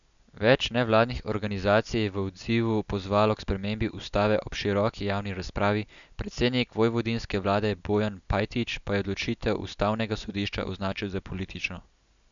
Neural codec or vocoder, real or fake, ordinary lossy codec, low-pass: none; real; none; 7.2 kHz